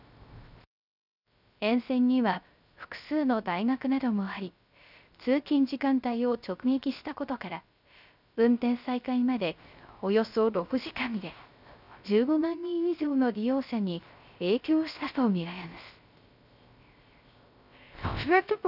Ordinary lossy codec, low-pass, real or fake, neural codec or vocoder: none; 5.4 kHz; fake; codec, 16 kHz, 0.3 kbps, FocalCodec